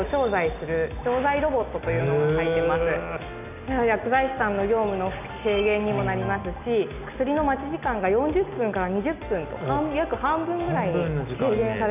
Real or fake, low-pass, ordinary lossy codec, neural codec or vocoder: real; 3.6 kHz; none; none